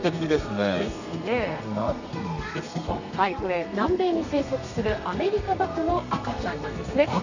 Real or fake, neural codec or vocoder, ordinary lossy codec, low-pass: fake; codec, 32 kHz, 1.9 kbps, SNAC; none; 7.2 kHz